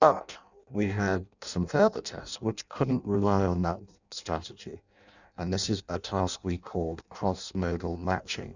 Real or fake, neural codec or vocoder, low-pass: fake; codec, 16 kHz in and 24 kHz out, 0.6 kbps, FireRedTTS-2 codec; 7.2 kHz